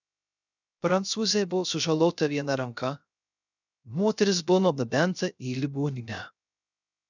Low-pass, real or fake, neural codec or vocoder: 7.2 kHz; fake; codec, 16 kHz, 0.3 kbps, FocalCodec